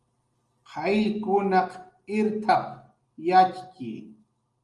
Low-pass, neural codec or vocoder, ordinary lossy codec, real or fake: 10.8 kHz; none; Opus, 32 kbps; real